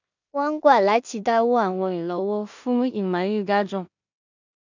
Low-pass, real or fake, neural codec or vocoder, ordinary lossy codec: 7.2 kHz; fake; codec, 16 kHz in and 24 kHz out, 0.4 kbps, LongCat-Audio-Codec, two codebook decoder; none